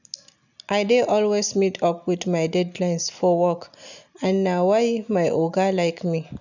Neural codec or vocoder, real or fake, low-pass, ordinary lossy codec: none; real; 7.2 kHz; none